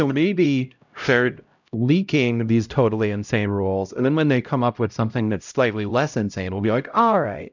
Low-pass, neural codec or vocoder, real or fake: 7.2 kHz; codec, 16 kHz, 0.5 kbps, X-Codec, HuBERT features, trained on LibriSpeech; fake